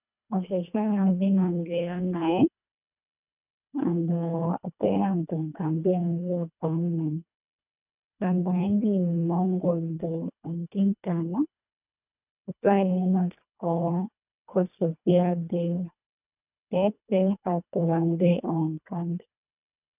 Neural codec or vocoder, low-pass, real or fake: codec, 24 kHz, 1.5 kbps, HILCodec; 3.6 kHz; fake